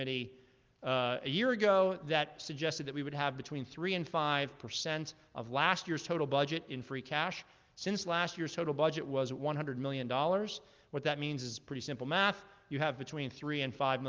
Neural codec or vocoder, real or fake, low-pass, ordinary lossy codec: none; real; 7.2 kHz; Opus, 32 kbps